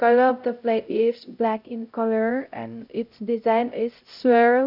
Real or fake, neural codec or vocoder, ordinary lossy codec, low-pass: fake; codec, 16 kHz, 0.5 kbps, X-Codec, HuBERT features, trained on LibriSpeech; none; 5.4 kHz